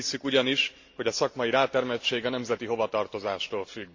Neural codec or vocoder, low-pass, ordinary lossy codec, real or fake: none; 7.2 kHz; MP3, 64 kbps; real